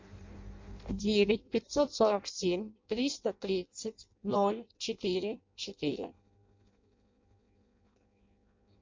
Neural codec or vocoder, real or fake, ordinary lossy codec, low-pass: codec, 16 kHz in and 24 kHz out, 0.6 kbps, FireRedTTS-2 codec; fake; MP3, 48 kbps; 7.2 kHz